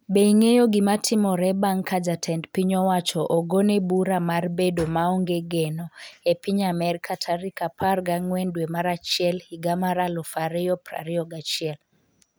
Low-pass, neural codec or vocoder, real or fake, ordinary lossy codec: none; none; real; none